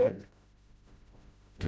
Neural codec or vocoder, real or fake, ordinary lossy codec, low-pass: codec, 16 kHz, 0.5 kbps, FreqCodec, smaller model; fake; none; none